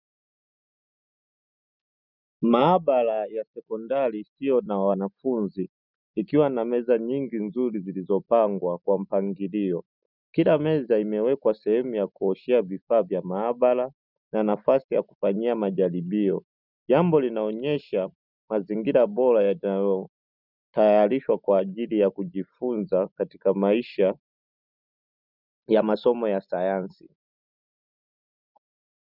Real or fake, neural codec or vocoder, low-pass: real; none; 5.4 kHz